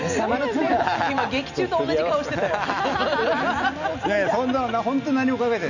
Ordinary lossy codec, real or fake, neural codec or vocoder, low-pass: none; real; none; 7.2 kHz